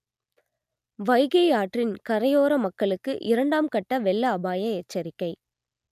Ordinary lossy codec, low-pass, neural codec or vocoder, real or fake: none; 14.4 kHz; none; real